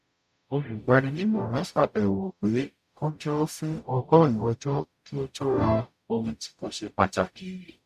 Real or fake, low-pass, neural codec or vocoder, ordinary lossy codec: fake; 14.4 kHz; codec, 44.1 kHz, 0.9 kbps, DAC; none